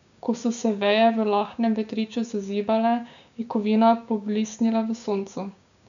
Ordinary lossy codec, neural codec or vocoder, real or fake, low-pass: none; codec, 16 kHz, 6 kbps, DAC; fake; 7.2 kHz